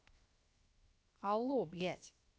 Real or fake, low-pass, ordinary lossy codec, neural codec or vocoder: fake; none; none; codec, 16 kHz, 0.7 kbps, FocalCodec